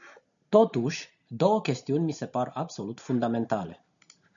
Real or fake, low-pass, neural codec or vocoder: real; 7.2 kHz; none